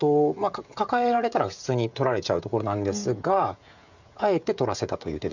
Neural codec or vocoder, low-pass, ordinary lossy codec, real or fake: codec, 16 kHz, 16 kbps, FreqCodec, smaller model; 7.2 kHz; none; fake